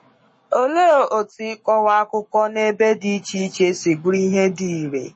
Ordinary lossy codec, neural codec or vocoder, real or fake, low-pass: MP3, 32 kbps; codec, 44.1 kHz, 7.8 kbps, Pupu-Codec; fake; 9.9 kHz